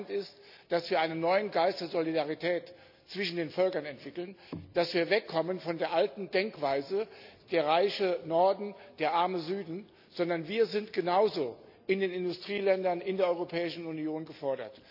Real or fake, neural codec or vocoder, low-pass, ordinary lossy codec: real; none; 5.4 kHz; none